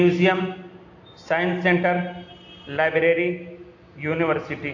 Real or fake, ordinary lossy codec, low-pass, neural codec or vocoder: real; AAC, 48 kbps; 7.2 kHz; none